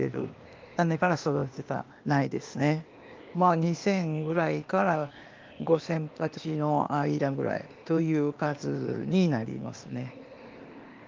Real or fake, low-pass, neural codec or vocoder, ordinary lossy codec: fake; 7.2 kHz; codec, 16 kHz, 0.8 kbps, ZipCodec; Opus, 32 kbps